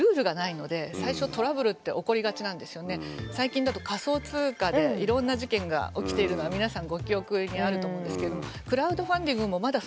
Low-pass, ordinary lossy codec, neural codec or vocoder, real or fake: none; none; none; real